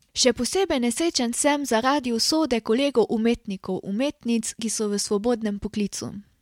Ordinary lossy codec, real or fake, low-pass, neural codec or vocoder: MP3, 96 kbps; real; 19.8 kHz; none